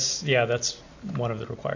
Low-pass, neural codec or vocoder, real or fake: 7.2 kHz; none; real